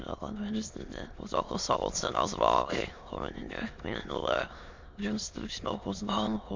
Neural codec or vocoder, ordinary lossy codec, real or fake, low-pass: autoencoder, 22.05 kHz, a latent of 192 numbers a frame, VITS, trained on many speakers; MP3, 64 kbps; fake; 7.2 kHz